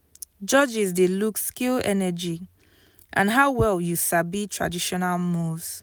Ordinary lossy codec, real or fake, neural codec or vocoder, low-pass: none; real; none; none